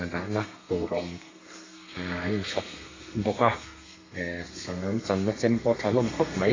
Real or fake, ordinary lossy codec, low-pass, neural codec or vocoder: fake; AAC, 32 kbps; 7.2 kHz; codec, 32 kHz, 1.9 kbps, SNAC